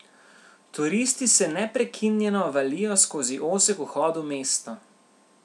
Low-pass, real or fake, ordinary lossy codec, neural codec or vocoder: none; real; none; none